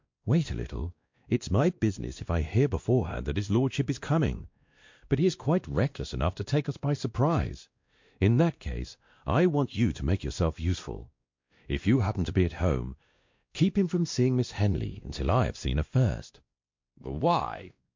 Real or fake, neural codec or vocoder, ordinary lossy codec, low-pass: fake; codec, 16 kHz, 1 kbps, X-Codec, WavLM features, trained on Multilingual LibriSpeech; MP3, 48 kbps; 7.2 kHz